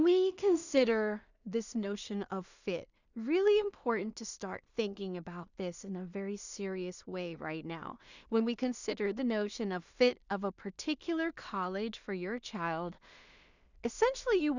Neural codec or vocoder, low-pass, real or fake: codec, 16 kHz in and 24 kHz out, 0.4 kbps, LongCat-Audio-Codec, two codebook decoder; 7.2 kHz; fake